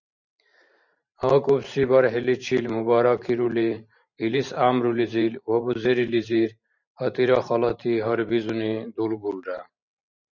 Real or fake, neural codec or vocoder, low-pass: fake; vocoder, 44.1 kHz, 128 mel bands every 512 samples, BigVGAN v2; 7.2 kHz